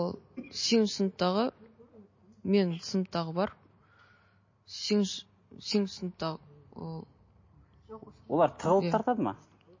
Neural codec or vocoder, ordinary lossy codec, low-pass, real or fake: none; MP3, 32 kbps; 7.2 kHz; real